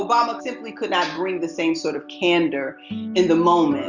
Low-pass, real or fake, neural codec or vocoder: 7.2 kHz; real; none